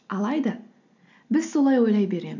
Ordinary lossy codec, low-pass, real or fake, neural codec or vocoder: none; 7.2 kHz; real; none